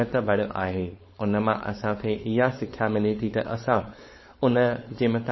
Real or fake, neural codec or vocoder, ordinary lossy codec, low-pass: fake; codec, 16 kHz, 4.8 kbps, FACodec; MP3, 24 kbps; 7.2 kHz